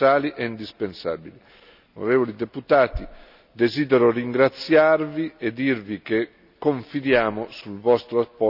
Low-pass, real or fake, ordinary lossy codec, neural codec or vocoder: 5.4 kHz; real; none; none